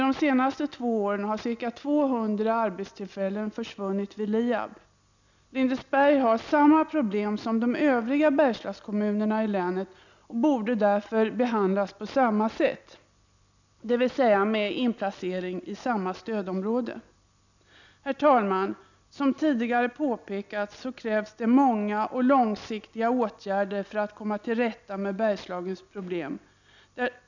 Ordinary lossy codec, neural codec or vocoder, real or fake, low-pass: none; none; real; 7.2 kHz